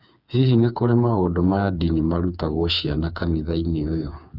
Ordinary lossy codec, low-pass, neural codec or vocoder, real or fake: none; 5.4 kHz; codec, 16 kHz, 4 kbps, FreqCodec, smaller model; fake